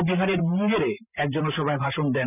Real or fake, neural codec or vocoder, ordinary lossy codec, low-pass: fake; vocoder, 44.1 kHz, 128 mel bands every 512 samples, BigVGAN v2; none; 3.6 kHz